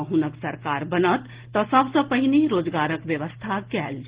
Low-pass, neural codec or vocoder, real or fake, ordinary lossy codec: 3.6 kHz; none; real; Opus, 16 kbps